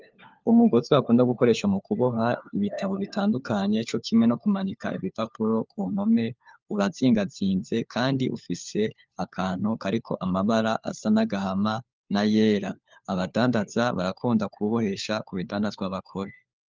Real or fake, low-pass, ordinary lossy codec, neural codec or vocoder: fake; 7.2 kHz; Opus, 24 kbps; codec, 16 kHz, 4 kbps, FunCodec, trained on LibriTTS, 50 frames a second